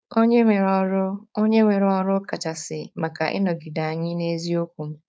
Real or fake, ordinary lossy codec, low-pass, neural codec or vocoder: fake; none; none; codec, 16 kHz, 4.8 kbps, FACodec